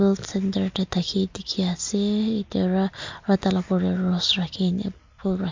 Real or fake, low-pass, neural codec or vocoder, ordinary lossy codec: real; 7.2 kHz; none; MP3, 64 kbps